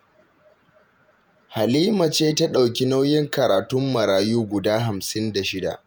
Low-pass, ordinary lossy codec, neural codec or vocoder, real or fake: none; none; vocoder, 48 kHz, 128 mel bands, Vocos; fake